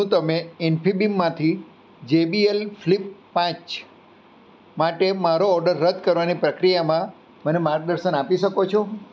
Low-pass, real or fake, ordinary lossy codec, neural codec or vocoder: none; real; none; none